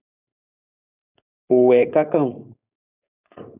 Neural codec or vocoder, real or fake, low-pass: codec, 16 kHz, 4.8 kbps, FACodec; fake; 3.6 kHz